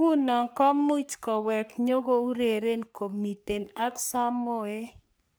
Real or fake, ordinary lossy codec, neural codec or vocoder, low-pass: fake; none; codec, 44.1 kHz, 3.4 kbps, Pupu-Codec; none